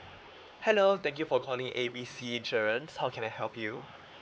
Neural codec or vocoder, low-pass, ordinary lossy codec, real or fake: codec, 16 kHz, 4 kbps, X-Codec, HuBERT features, trained on LibriSpeech; none; none; fake